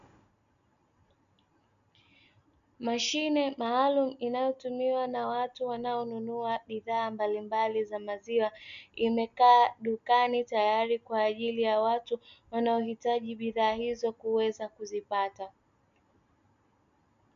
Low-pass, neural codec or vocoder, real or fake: 7.2 kHz; none; real